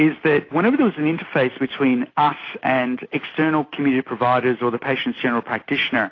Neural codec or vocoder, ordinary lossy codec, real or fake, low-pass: none; AAC, 32 kbps; real; 7.2 kHz